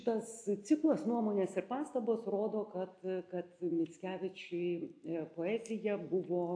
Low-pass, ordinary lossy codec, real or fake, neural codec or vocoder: 9.9 kHz; AAC, 48 kbps; real; none